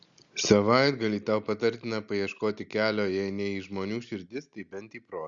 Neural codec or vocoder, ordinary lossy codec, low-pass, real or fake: none; Opus, 64 kbps; 7.2 kHz; real